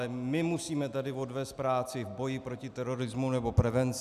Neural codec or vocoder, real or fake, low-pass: none; real; 14.4 kHz